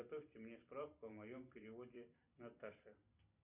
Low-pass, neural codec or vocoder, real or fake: 3.6 kHz; vocoder, 44.1 kHz, 128 mel bands every 256 samples, BigVGAN v2; fake